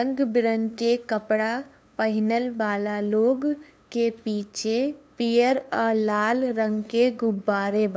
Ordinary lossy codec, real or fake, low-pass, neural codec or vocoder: none; fake; none; codec, 16 kHz, 2 kbps, FunCodec, trained on LibriTTS, 25 frames a second